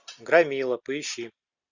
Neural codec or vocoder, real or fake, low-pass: none; real; 7.2 kHz